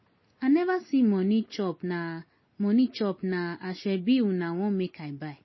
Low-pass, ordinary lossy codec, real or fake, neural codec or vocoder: 7.2 kHz; MP3, 24 kbps; real; none